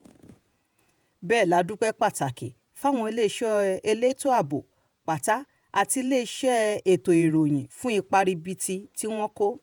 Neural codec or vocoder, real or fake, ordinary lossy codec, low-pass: vocoder, 48 kHz, 128 mel bands, Vocos; fake; none; none